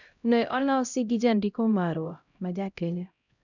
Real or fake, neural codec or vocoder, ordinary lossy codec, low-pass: fake; codec, 16 kHz, 0.5 kbps, X-Codec, HuBERT features, trained on LibriSpeech; none; 7.2 kHz